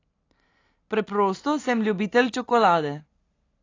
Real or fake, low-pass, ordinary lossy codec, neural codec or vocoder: real; 7.2 kHz; AAC, 32 kbps; none